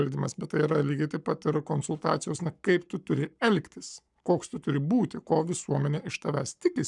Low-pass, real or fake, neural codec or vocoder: 10.8 kHz; fake; codec, 44.1 kHz, 7.8 kbps, Pupu-Codec